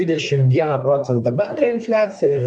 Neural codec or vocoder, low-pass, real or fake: codec, 24 kHz, 1 kbps, SNAC; 9.9 kHz; fake